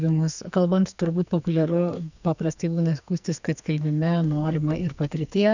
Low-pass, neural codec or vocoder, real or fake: 7.2 kHz; codec, 44.1 kHz, 2.6 kbps, SNAC; fake